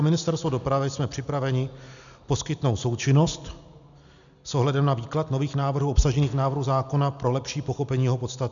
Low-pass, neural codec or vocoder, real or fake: 7.2 kHz; none; real